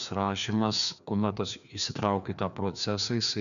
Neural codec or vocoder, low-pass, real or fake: codec, 16 kHz, 2 kbps, FreqCodec, larger model; 7.2 kHz; fake